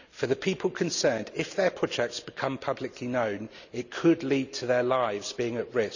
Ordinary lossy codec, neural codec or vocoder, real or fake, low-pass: none; none; real; 7.2 kHz